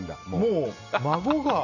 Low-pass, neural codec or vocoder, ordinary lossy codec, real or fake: 7.2 kHz; none; none; real